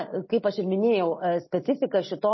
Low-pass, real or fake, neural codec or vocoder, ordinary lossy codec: 7.2 kHz; real; none; MP3, 24 kbps